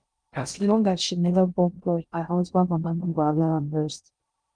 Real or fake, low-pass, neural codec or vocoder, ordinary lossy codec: fake; 9.9 kHz; codec, 16 kHz in and 24 kHz out, 0.6 kbps, FocalCodec, streaming, 2048 codes; Opus, 32 kbps